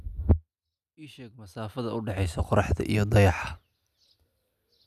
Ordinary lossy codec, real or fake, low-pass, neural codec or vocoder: none; real; 14.4 kHz; none